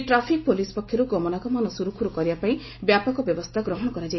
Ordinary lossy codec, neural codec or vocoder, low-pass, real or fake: MP3, 24 kbps; none; 7.2 kHz; real